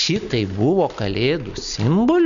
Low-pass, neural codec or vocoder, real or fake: 7.2 kHz; none; real